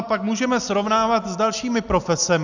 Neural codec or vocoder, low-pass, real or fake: none; 7.2 kHz; real